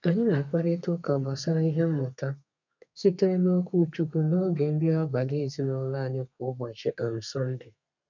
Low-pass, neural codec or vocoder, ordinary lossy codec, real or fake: 7.2 kHz; codec, 32 kHz, 1.9 kbps, SNAC; none; fake